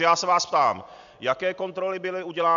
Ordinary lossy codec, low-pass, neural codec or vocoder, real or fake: MP3, 64 kbps; 7.2 kHz; none; real